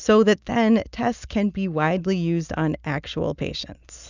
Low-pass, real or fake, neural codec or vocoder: 7.2 kHz; real; none